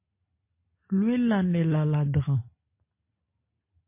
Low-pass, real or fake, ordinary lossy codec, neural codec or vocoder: 3.6 kHz; real; MP3, 24 kbps; none